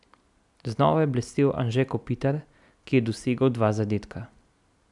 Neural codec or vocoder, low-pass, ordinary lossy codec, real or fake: none; 10.8 kHz; none; real